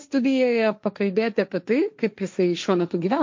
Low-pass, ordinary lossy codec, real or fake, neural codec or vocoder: 7.2 kHz; MP3, 48 kbps; fake; codec, 16 kHz, 1.1 kbps, Voila-Tokenizer